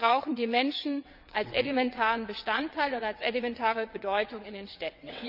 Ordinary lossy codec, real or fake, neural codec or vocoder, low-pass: none; fake; vocoder, 22.05 kHz, 80 mel bands, WaveNeXt; 5.4 kHz